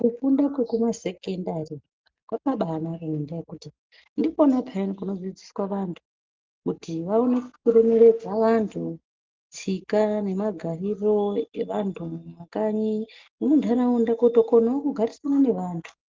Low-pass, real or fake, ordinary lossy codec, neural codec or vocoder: 7.2 kHz; real; Opus, 32 kbps; none